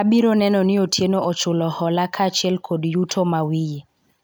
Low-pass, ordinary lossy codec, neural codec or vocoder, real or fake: none; none; none; real